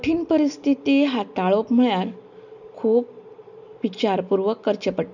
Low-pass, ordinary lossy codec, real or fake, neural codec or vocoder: 7.2 kHz; none; real; none